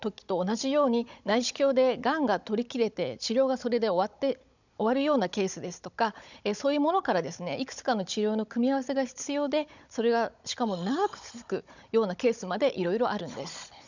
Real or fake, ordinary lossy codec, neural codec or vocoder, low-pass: fake; none; codec, 16 kHz, 16 kbps, FunCodec, trained on Chinese and English, 50 frames a second; 7.2 kHz